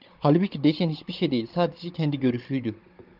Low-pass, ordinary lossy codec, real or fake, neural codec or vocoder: 5.4 kHz; Opus, 32 kbps; fake; codec, 16 kHz, 16 kbps, FunCodec, trained on Chinese and English, 50 frames a second